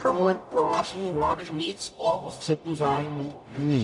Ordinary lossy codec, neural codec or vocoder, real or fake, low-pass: AAC, 64 kbps; codec, 44.1 kHz, 0.9 kbps, DAC; fake; 10.8 kHz